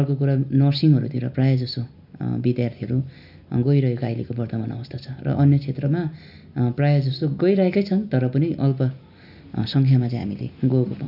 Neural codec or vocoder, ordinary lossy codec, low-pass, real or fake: none; none; 5.4 kHz; real